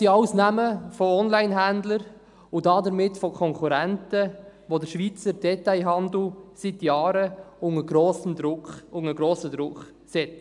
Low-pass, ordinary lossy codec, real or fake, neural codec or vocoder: 10.8 kHz; none; real; none